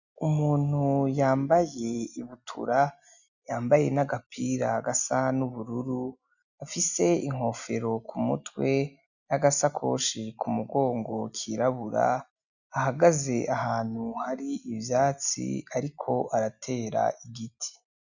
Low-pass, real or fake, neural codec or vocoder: 7.2 kHz; real; none